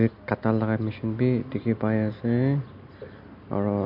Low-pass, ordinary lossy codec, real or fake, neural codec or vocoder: 5.4 kHz; AAC, 48 kbps; real; none